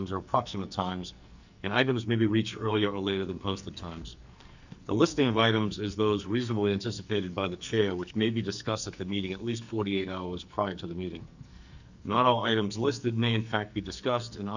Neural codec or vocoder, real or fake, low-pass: codec, 44.1 kHz, 2.6 kbps, SNAC; fake; 7.2 kHz